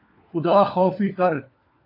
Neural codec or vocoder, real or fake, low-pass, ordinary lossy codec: codec, 16 kHz, 4 kbps, FunCodec, trained on LibriTTS, 50 frames a second; fake; 5.4 kHz; AAC, 32 kbps